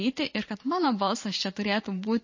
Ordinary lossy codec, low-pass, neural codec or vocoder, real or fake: MP3, 32 kbps; 7.2 kHz; vocoder, 44.1 kHz, 128 mel bands every 512 samples, BigVGAN v2; fake